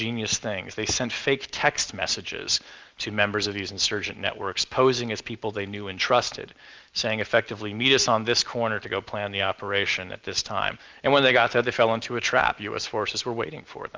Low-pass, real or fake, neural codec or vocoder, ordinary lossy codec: 7.2 kHz; real; none; Opus, 24 kbps